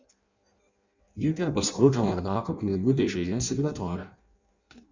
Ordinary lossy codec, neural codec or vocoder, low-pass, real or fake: Opus, 64 kbps; codec, 16 kHz in and 24 kHz out, 0.6 kbps, FireRedTTS-2 codec; 7.2 kHz; fake